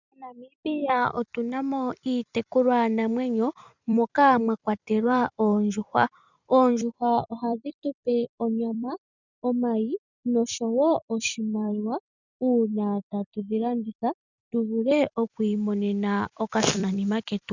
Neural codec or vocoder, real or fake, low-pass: vocoder, 44.1 kHz, 128 mel bands every 256 samples, BigVGAN v2; fake; 7.2 kHz